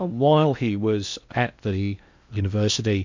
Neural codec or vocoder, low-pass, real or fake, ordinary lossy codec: codec, 16 kHz in and 24 kHz out, 0.8 kbps, FocalCodec, streaming, 65536 codes; 7.2 kHz; fake; MP3, 64 kbps